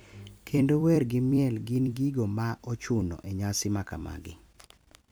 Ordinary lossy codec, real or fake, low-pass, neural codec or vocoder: none; fake; none; vocoder, 44.1 kHz, 128 mel bands every 256 samples, BigVGAN v2